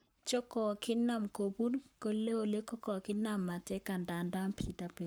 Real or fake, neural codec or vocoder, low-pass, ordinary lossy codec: fake; codec, 44.1 kHz, 7.8 kbps, Pupu-Codec; none; none